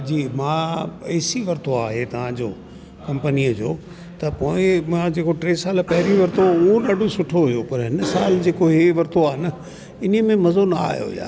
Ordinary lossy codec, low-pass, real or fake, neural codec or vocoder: none; none; real; none